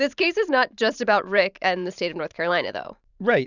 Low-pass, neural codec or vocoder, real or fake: 7.2 kHz; vocoder, 44.1 kHz, 128 mel bands every 512 samples, BigVGAN v2; fake